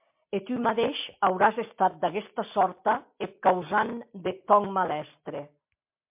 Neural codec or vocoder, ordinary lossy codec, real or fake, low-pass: none; MP3, 32 kbps; real; 3.6 kHz